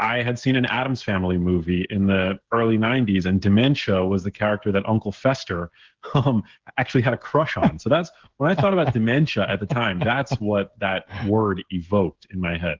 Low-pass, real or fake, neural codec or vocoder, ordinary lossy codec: 7.2 kHz; fake; codec, 16 kHz, 16 kbps, FreqCodec, smaller model; Opus, 16 kbps